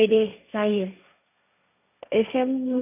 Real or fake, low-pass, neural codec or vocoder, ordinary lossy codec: fake; 3.6 kHz; codec, 16 kHz, 1.1 kbps, Voila-Tokenizer; none